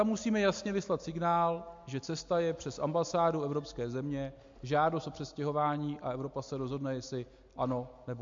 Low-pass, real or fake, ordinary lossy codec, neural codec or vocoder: 7.2 kHz; real; MP3, 48 kbps; none